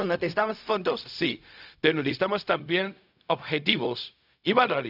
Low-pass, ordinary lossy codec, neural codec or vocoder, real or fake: 5.4 kHz; none; codec, 16 kHz, 0.4 kbps, LongCat-Audio-Codec; fake